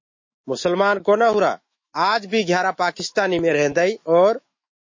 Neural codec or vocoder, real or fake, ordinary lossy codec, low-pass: autoencoder, 48 kHz, 128 numbers a frame, DAC-VAE, trained on Japanese speech; fake; MP3, 32 kbps; 7.2 kHz